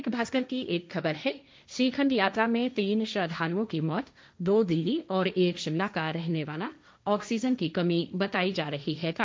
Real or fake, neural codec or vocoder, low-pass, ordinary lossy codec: fake; codec, 16 kHz, 1.1 kbps, Voila-Tokenizer; none; none